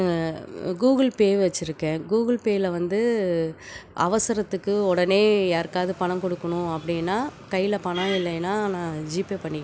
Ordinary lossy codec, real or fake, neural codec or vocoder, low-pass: none; real; none; none